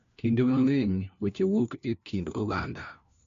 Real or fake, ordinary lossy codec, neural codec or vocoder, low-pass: fake; MP3, 48 kbps; codec, 16 kHz, 1 kbps, FunCodec, trained on LibriTTS, 50 frames a second; 7.2 kHz